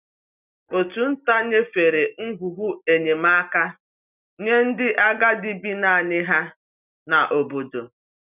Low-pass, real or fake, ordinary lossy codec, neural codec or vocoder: 3.6 kHz; real; none; none